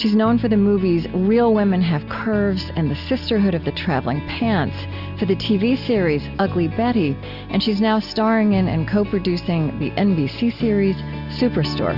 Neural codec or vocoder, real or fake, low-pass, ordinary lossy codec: none; real; 5.4 kHz; Opus, 64 kbps